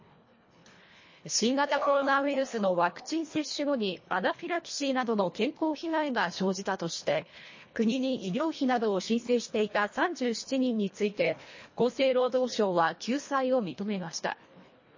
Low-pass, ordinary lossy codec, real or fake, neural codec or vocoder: 7.2 kHz; MP3, 32 kbps; fake; codec, 24 kHz, 1.5 kbps, HILCodec